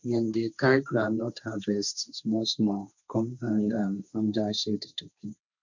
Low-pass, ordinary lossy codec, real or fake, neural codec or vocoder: 7.2 kHz; none; fake; codec, 16 kHz, 1.1 kbps, Voila-Tokenizer